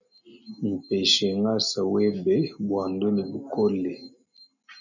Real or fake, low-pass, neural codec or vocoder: real; 7.2 kHz; none